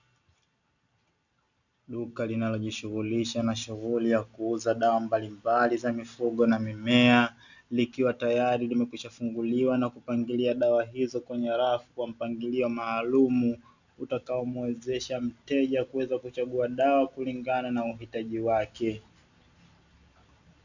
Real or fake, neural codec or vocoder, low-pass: real; none; 7.2 kHz